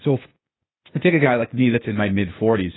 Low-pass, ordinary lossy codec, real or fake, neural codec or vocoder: 7.2 kHz; AAC, 16 kbps; fake; codec, 16 kHz, 0.8 kbps, ZipCodec